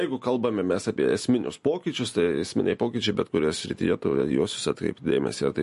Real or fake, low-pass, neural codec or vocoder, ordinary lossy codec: real; 14.4 kHz; none; MP3, 48 kbps